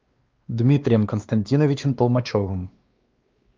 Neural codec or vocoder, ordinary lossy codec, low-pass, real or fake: codec, 16 kHz, 2 kbps, X-Codec, WavLM features, trained on Multilingual LibriSpeech; Opus, 24 kbps; 7.2 kHz; fake